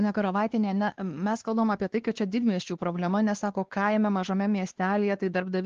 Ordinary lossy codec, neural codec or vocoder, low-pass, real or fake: Opus, 16 kbps; codec, 16 kHz, 2 kbps, X-Codec, WavLM features, trained on Multilingual LibriSpeech; 7.2 kHz; fake